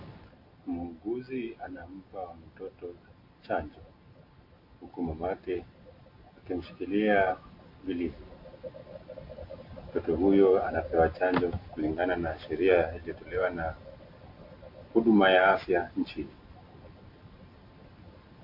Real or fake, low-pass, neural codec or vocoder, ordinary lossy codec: real; 5.4 kHz; none; MP3, 32 kbps